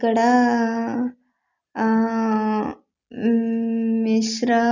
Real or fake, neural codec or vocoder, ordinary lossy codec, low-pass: real; none; none; 7.2 kHz